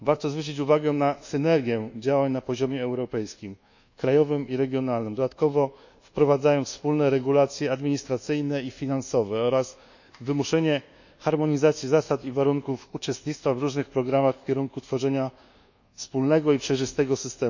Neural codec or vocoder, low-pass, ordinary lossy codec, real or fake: codec, 24 kHz, 1.2 kbps, DualCodec; 7.2 kHz; none; fake